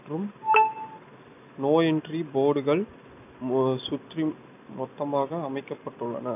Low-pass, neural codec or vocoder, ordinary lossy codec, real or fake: 3.6 kHz; none; none; real